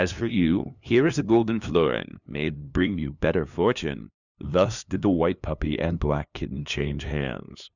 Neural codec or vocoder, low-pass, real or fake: codec, 16 kHz, 2 kbps, FunCodec, trained on LibriTTS, 25 frames a second; 7.2 kHz; fake